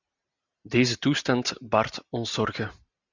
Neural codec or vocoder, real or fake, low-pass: none; real; 7.2 kHz